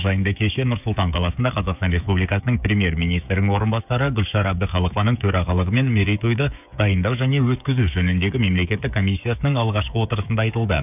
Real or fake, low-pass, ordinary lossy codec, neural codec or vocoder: fake; 3.6 kHz; none; codec, 16 kHz, 16 kbps, FreqCodec, smaller model